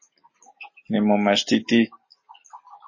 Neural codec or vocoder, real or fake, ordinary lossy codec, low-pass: none; real; MP3, 32 kbps; 7.2 kHz